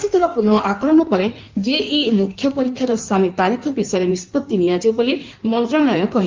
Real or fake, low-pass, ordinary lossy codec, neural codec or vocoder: fake; 7.2 kHz; Opus, 24 kbps; codec, 16 kHz in and 24 kHz out, 1.1 kbps, FireRedTTS-2 codec